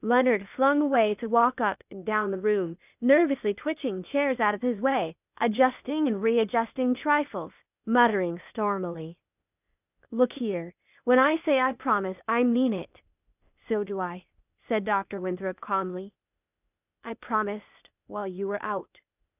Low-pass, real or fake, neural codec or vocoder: 3.6 kHz; fake; codec, 16 kHz, 0.8 kbps, ZipCodec